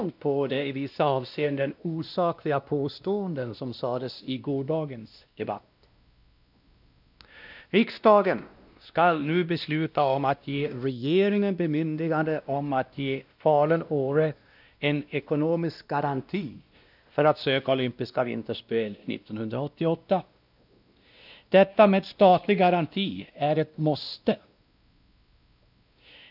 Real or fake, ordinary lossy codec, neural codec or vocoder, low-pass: fake; none; codec, 16 kHz, 1 kbps, X-Codec, WavLM features, trained on Multilingual LibriSpeech; 5.4 kHz